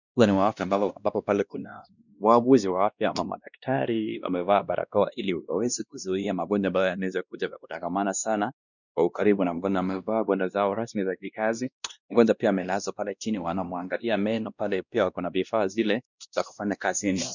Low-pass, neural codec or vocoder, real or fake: 7.2 kHz; codec, 16 kHz, 1 kbps, X-Codec, WavLM features, trained on Multilingual LibriSpeech; fake